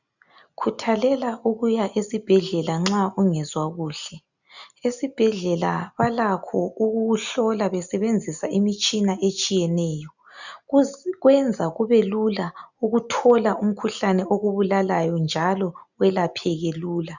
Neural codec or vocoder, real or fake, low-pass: none; real; 7.2 kHz